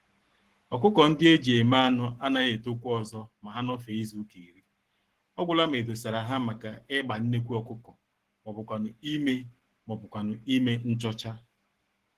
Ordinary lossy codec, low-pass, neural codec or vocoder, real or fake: Opus, 16 kbps; 14.4 kHz; codec, 44.1 kHz, 7.8 kbps, Pupu-Codec; fake